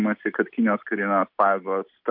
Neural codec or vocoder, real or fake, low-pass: none; real; 5.4 kHz